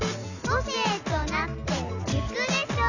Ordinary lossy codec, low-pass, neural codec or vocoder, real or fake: none; 7.2 kHz; none; real